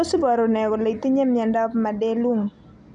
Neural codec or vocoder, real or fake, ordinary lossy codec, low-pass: none; real; none; 9.9 kHz